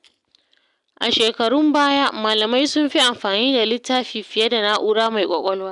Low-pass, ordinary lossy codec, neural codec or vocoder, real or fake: 10.8 kHz; none; none; real